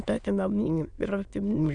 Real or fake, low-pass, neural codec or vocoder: fake; 9.9 kHz; autoencoder, 22.05 kHz, a latent of 192 numbers a frame, VITS, trained on many speakers